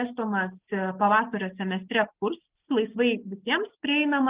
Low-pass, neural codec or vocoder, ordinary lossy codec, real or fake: 3.6 kHz; none; Opus, 32 kbps; real